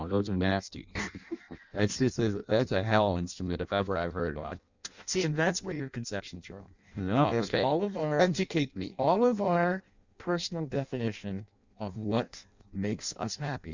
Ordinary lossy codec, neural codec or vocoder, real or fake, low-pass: Opus, 64 kbps; codec, 16 kHz in and 24 kHz out, 0.6 kbps, FireRedTTS-2 codec; fake; 7.2 kHz